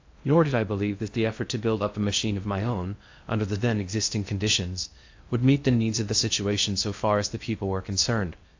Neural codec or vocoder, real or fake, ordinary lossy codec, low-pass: codec, 16 kHz in and 24 kHz out, 0.6 kbps, FocalCodec, streaming, 2048 codes; fake; AAC, 48 kbps; 7.2 kHz